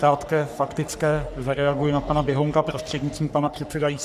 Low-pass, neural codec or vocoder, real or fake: 14.4 kHz; codec, 44.1 kHz, 3.4 kbps, Pupu-Codec; fake